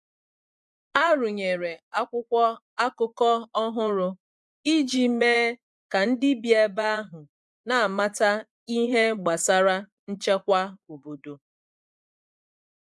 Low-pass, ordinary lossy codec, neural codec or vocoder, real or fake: none; none; vocoder, 24 kHz, 100 mel bands, Vocos; fake